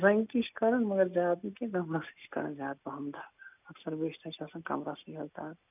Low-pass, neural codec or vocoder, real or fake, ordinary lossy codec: 3.6 kHz; none; real; none